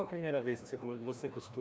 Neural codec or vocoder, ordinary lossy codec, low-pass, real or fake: codec, 16 kHz, 1 kbps, FreqCodec, larger model; none; none; fake